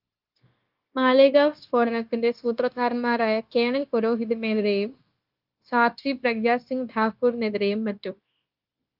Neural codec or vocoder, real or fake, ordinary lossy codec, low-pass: codec, 16 kHz, 0.9 kbps, LongCat-Audio-Codec; fake; Opus, 24 kbps; 5.4 kHz